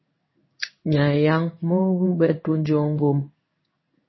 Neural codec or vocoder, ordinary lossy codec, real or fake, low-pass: codec, 16 kHz in and 24 kHz out, 1 kbps, XY-Tokenizer; MP3, 24 kbps; fake; 7.2 kHz